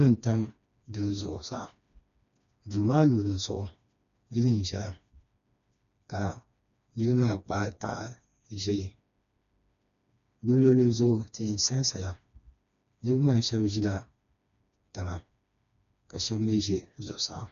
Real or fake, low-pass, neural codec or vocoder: fake; 7.2 kHz; codec, 16 kHz, 2 kbps, FreqCodec, smaller model